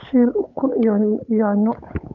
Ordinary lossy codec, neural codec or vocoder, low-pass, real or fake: none; codec, 16 kHz, 8 kbps, FunCodec, trained on Chinese and English, 25 frames a second; 7.2 kHz; fake